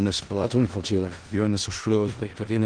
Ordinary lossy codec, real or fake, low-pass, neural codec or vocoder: Opus, 16 kbps; fake; 9.9 kHz; codec, 16 kHz in and 24 kHz out, 0.4 kbps, LongCat-Audio-Codec, four codebook decoder